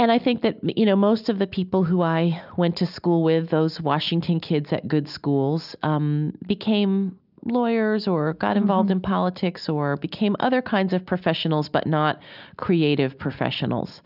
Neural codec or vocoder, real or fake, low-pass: none; real; 5.4 kHz